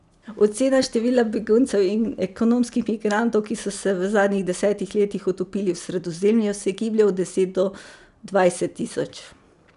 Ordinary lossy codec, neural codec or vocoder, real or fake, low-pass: none; none; real; 10.8 kHz